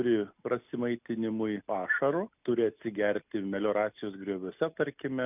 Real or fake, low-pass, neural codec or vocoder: real; 3.6 kHz; none